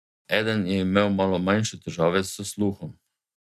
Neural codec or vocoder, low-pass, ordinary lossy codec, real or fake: none; 14.4 kHz; MP3, 96 kbps; real